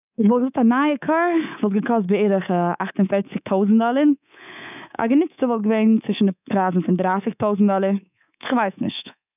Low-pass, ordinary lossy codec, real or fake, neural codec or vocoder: 3.6 kHz; none; fake; codec, 24 kHz, 3.1 kbps, DualCodec